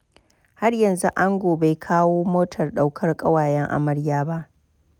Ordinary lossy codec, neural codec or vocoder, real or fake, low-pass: none; none; real; 19.8 kHz